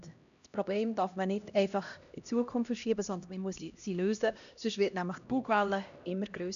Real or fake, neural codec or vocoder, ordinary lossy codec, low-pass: fake; codec, 16 kHz, 1 kbps, X-Codec, HuBERT features, trained on LibriSpeech; none; 7.2 kHz